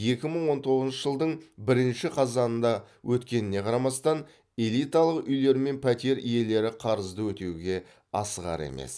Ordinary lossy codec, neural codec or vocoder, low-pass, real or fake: none; none; none; real